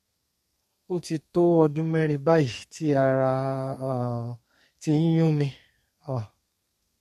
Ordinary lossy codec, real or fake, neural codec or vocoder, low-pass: MP3, 64 kbps; fake; codec, 32 kHz, 1.9 kbps, SNAC; 14.4 kHz